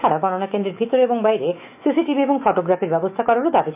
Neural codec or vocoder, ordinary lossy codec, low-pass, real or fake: autoencoder, 48 kHz, 128 numbers a frame, DAC-VAE, trained on Japanese speech; none; 3.6 kHz; fake